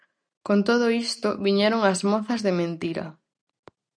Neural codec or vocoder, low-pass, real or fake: none; 9.9 kHz; real